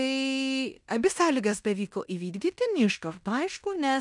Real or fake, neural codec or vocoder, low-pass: fake; codec, 16 kHz in and 24 kHz out, 0.9 kbps, LongCat-Audio-Codec, fine tuned four codebook decoder; 10.8 kHz